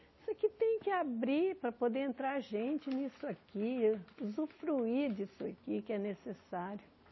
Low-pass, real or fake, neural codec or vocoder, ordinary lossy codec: 7.2 kHz; real; none; MP3, 24 kbps